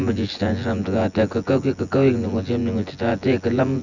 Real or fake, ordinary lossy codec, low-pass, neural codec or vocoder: fake; none; 7.2 kHz; vocoder, 24 kHz, 100 mel bands, Vocos